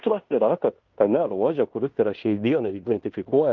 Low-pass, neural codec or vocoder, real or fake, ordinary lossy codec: 7.2 kHz; codec, 16 kHz in and 24 kHz out, 0.9 kbps, LongCat-Audio-Codec, fine tuned four codebook decoder; fake; Opus, 24 kbps